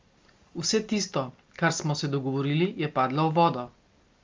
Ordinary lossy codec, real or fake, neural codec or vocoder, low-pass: Opus, 32 kbps; real; none; 7.2 kHz